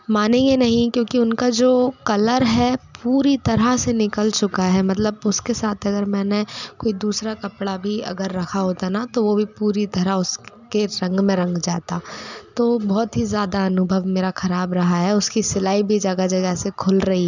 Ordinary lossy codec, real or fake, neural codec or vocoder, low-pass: none; real; none; 7.2 kHz